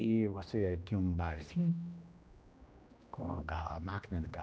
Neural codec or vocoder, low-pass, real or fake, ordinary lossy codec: codec, 16 kHz, 1 kbps, X-Codec, HuBERT features, trained on general audio; none; fake; none